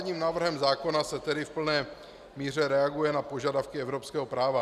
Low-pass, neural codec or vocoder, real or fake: 14.4 kHz; none; real